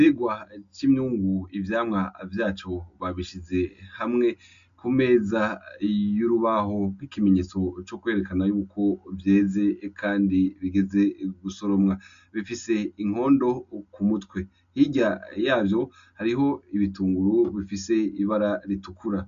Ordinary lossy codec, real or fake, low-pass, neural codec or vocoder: MP3, 64 kbps; real; 7.2 kHz; none